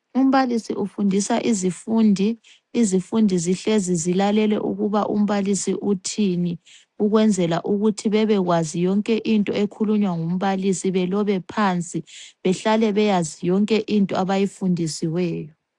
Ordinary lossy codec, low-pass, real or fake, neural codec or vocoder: AAC, 64 kbps; 10.8 kHz; real; none